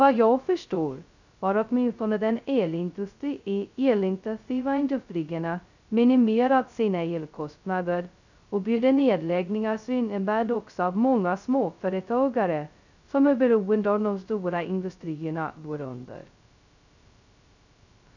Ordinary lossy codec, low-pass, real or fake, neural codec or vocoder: none; 7.2 kHz; fake; codec, 16 kHz, 0.2 kbps, FocalCodec